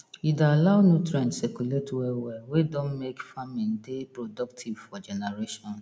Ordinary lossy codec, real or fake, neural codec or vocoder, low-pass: none; real; none; none